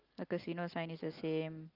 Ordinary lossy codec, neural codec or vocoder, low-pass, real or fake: Opus, 24 kbps; none; 5.4 kHz; real